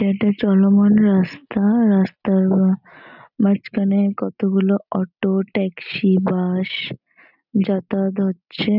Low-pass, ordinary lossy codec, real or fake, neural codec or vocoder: 5.4 kHz; none; real; none